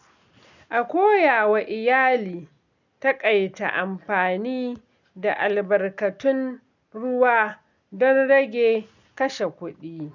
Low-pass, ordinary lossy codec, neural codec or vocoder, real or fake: 7.2 kHz; none; none; real